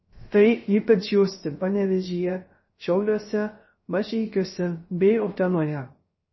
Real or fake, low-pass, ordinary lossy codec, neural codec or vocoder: fake; 7.2 kHz; MP3, 24 kbps; codec, 16 kHz, 0.3 kbps, FocalCodec